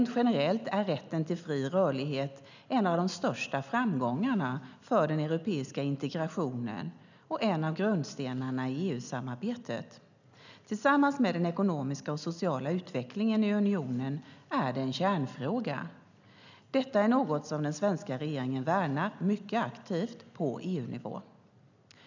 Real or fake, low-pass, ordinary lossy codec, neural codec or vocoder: real; 7.2 kHz; none; none